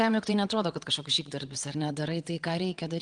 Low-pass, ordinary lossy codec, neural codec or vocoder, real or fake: 9.9 kHz; Opus, 24 kbps; vocoder, 22.05 kHz, 80 mel bands, WaveNeXt; fake